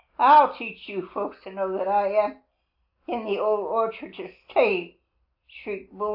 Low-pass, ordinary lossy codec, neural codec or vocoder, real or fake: 5.4 kHz; AAC, 48 kbps; none; real